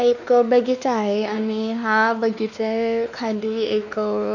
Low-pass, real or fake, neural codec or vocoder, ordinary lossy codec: 7.2 kHz; fake; codec, 16 kHz, 2 kbps, X-Codec, WavLM features, trained on Multilingual LibriSpeech; none